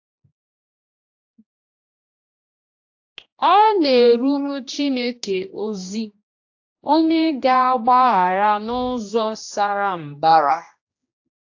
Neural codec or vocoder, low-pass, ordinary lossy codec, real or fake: codec, 16 kHz, 1 kbps, X-Codec, HuBERT features, trained on general audio; 7.2 kHz; AAC, 48 kbps; fake